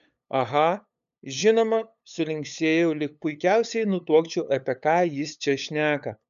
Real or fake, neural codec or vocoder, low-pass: fake; codec, 16 kHz, 8 kbps, FunCodec, trained on LibriTTS, 25 frames a second; 7.2 kHz